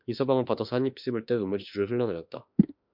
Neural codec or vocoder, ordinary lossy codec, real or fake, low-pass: autoencoder, 48 kHz, 32 numbers a frame, DAC-VAE, trained on Japanese speech; MP3, 48 kbps; fake; 5.4 kHz